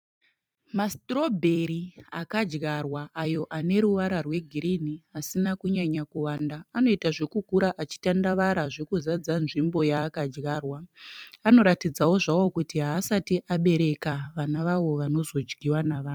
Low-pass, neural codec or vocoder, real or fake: 19.8 kHz; vocoder, 44.1 kHz, 128 mel bands every 256 samples, BigVGAN v2; fake